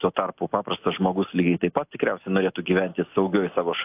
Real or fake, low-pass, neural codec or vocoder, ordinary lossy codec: real; 3.6 kHz; none; AAC, 32 kbps